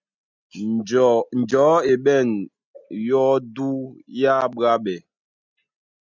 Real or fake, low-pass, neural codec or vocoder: real; 7.2 kHz; none